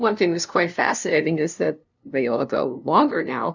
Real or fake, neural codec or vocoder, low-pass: fake; codec, 16 kHz, 0.5 kbps, FunCodec, trained on LibriTTS, 25 frames a second; 7.2 kHz